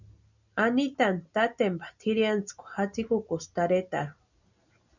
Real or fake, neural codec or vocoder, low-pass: real; none; 7.2 kHz